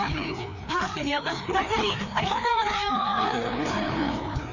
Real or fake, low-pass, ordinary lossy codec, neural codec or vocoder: fake; 7.2 kHz; none; codec, 16 kHz, 2 kbps, FreqCodec, larger model